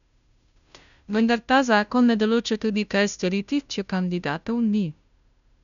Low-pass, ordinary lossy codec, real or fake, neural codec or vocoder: 7.2 kHz; none; fake; codec, 16 kHz, 0.5 kbps, FunCodec, trained on Chinese and English, 25 frames a second